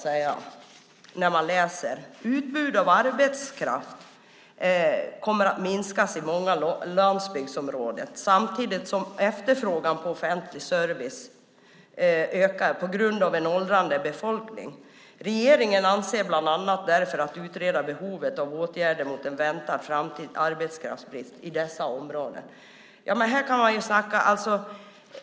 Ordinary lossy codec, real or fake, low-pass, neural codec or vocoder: none; real; none; none